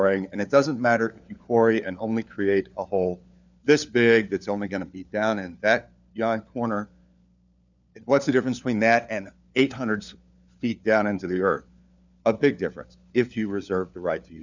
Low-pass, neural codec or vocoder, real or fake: 7.2 kHz; codec, 16 kHz, 4 kbps, FunCodec, trained on LibriTTS, 50 frames a second; fake